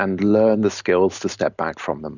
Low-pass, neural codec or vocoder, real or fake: 7.2 kHz; none; real